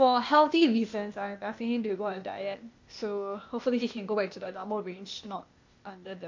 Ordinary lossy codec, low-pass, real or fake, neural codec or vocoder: MP3, 48 kbps; 7.2 kHz; fake; codec, 16 kHz, 0.8 kbps, ZipCodec